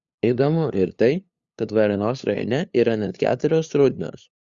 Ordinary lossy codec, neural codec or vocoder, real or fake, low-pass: Opus, 64 kbps; codec, 16 kHz, 2 kbps, FunCodec, trained on LibriTTS, 25 frames a second; fake; 7.2 kHz